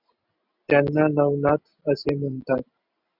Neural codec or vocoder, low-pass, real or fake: none; 5.4 kHz; real